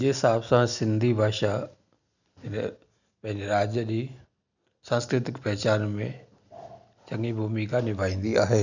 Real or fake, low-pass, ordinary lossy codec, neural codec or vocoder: real; 7.2 kHz; none; none